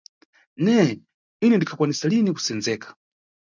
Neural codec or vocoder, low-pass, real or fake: none; 7.2 kHz; real